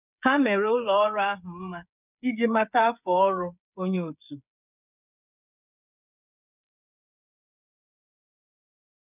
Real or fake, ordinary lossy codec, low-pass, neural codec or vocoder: fake; none; 3.6 kHz; codec, 16 kHz, 8 kbps, FreqCodec, smaller model